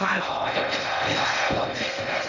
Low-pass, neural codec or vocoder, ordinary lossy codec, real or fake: 7.2 kHz; codec, 16 kHz in and 24 kHz out, 0.6 kbps, FocalCodec, streaming, 2048 codes; none; fake